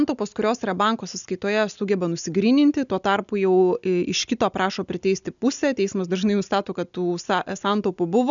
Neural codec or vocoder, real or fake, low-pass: none; real; 7.2 kHz